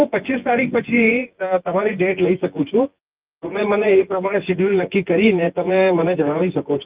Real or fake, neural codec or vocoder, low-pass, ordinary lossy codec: fake; vocoder, 24 kHz, 100 mel bands, Vocos; 3.6 kHz; Opus, 32 kbps